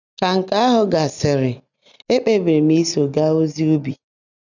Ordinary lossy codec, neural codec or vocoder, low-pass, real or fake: none; none; 7.2 kHz; real